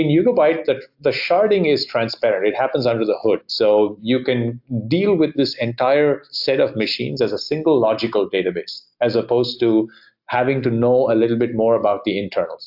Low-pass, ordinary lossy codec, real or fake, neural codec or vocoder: 5.4 kHz; AAC, 48 kbps; real; none